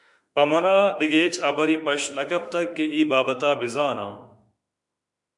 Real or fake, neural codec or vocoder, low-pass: fake; autoencoder, 48 kHz, 32 numbers a frame, DAC-VAE, trained on Japanese speech; 10.8 kHz